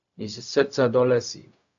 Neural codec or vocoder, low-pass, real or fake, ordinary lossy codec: codec, 16 kHz, 0.4 kbps, LongCat-Audio-Codec; 7.2 kHz; fake; AAC, 64 kbps